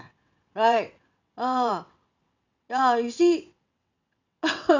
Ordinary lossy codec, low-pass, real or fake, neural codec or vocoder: none; 7.2 kHz; fake; vocoder, 22.05 kHz, 80 mel bands, WaveNeXt